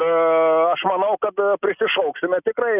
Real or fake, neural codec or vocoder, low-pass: real; none; 3.6 kHz